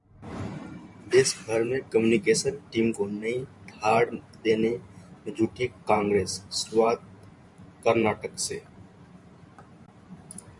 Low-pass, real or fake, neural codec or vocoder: 10.8 kHz; fake; vocoder, 44.1 kHz, 128 mel bands every 512 samples, BigVGAN v2